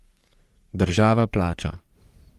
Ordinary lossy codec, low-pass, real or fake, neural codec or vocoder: Opus, 32 kbps; 14.4 kHz; fake; codec, 44.1 kHz, 3.4 kbps, Pupu-Codec